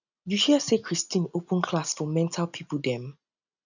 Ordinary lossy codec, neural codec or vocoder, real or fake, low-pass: none; none; real; 7.2 kHz